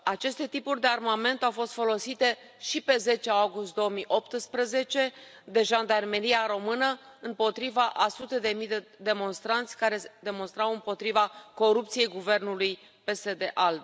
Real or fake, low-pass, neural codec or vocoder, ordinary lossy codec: real; none; none; none